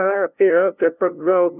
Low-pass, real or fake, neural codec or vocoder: 3.6 kHz; fake; codec, 16 kHz, 0.5 kbps, FunCodec, trained on LibriTTS, 25 frames a second